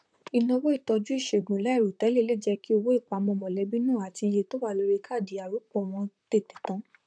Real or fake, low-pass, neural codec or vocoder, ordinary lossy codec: fake; 9.9 kHz; vocoder, 44.1 kHz, 128 mel bands, Pupu-Vocoder; none